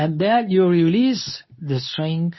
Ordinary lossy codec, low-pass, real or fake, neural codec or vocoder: MP3, 24 kbps; 7.2 kHz; fake; codec, 24 kHz, 0.9 kbps, WavTokenizer, medium speech release version 2